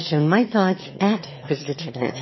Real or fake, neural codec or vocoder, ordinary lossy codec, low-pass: fake; autoencoder, 22.05 kHz, a latent of 192 numbers a frame, VITS, trained on one speaker; MP3, 24 kbps; 7.2 kHz